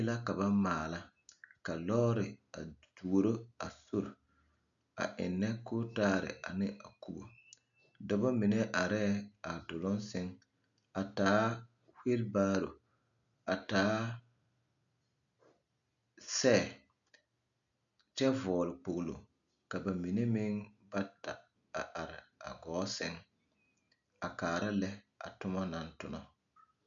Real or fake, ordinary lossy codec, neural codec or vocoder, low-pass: real; MP3, 96 kbps; none; 7.2 kHz